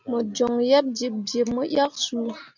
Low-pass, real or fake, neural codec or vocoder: 7.2 kHz; real; none